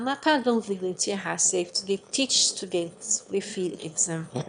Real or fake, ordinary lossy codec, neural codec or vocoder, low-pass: fake; none; autoencoder, 22.05 kHz, a latent of 192 numbers a frame, VITS, trained on one speaker; 9.9 kHz